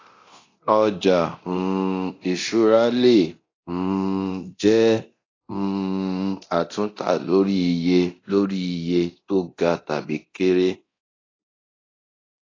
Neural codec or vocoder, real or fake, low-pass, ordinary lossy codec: codec, 24 kHz, 0.9 kbps, DualCodec; fake; 7.2 kHz; AAC, 32 kbps